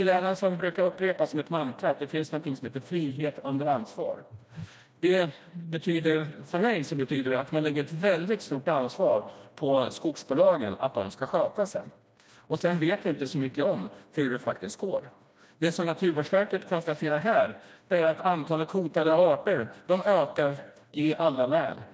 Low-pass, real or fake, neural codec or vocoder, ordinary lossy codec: none; fake; codec, 16 kHz, 1 kbps, FreqCodec, smaller model; none